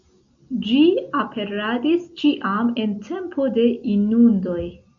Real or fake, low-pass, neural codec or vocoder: real; 7.2 kHz; none